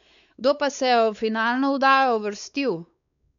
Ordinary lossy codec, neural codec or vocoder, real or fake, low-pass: none; codec, 16 kHz, 4 kbps, X-Codec, WavLM features, trained on Multilingual LibriSpeech; fake; 7.2 kHz